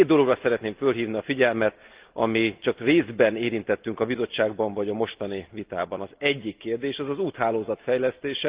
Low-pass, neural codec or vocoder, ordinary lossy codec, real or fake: 3.6 kHz; none; Opus, 32 kbps; real